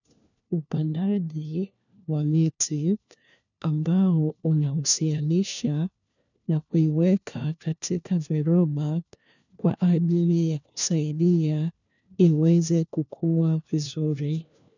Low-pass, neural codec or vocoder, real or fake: 7.2 kHz; codec, 16 kHz, 1 kbps, FunCodec, trained on LibriTTS, 50 frames a second; fake